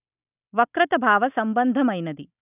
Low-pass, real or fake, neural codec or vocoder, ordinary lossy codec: 3.6 kHz; real; none; none